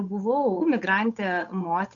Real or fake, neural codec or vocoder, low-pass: fake; codec, 16 kHz, 8 kbps, FunCodec, trained on Chinese and English, 25 frames a second; 7.2 kHz